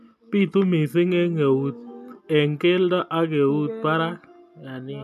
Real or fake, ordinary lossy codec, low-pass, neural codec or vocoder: real; none; 14.4 kHz; none